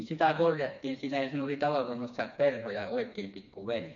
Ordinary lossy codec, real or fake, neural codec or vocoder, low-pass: MP3, 48 kbps; fake; codec, 16 kHz, 2 kbps, FreqCodec, smaller model; 7.2 kHz